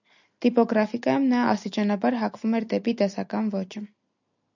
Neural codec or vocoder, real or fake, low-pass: none; real; 7.2 kHz